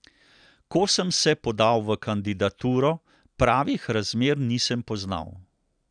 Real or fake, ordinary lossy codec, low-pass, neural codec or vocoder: real; none; 9.9 kHz; none